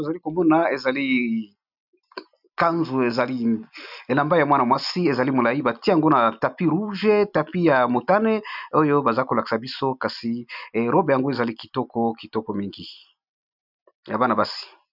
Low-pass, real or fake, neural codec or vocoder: 5.4 kHz; real; none